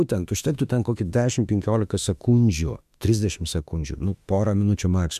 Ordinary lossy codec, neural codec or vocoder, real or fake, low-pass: MP3, 96 kbps; autoencoder, 48 kHz, 32 numbers a frame, DAC-VAE, trained on Japanese speech; fake; 14.4 kHz